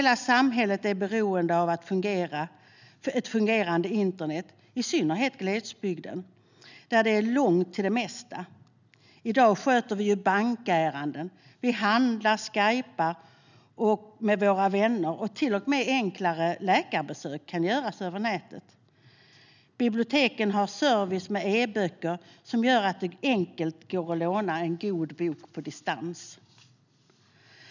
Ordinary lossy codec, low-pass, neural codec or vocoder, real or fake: none; 7.2 kHz; none; real